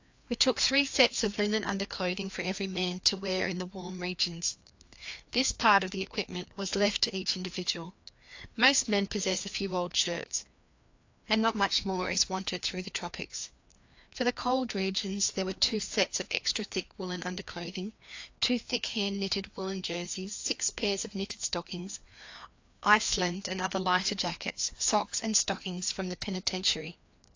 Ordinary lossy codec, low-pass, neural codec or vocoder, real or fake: AAC, 48 kbps; 7.2 kHz; codec, 16 kHz, 2 kbps, FreqCodec, larger model; fake